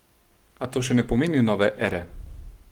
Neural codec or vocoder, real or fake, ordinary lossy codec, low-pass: codec, 44.1 kHz, 7.8 kbps, Pupu-Codec; fake; Opus, 24 kbps; 19.8 kHz